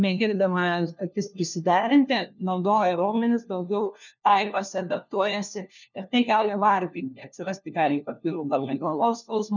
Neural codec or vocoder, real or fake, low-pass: codec, 16 kHz, 1 kbps, FunCodec, trained on LibriTTS, 50 frames a second; fake; 7.2 kHz